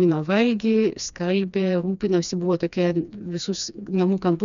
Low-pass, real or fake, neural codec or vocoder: 7.2 kHz; fake; codec, 16 kHz, 2 kbps, FreqCodec, smaller model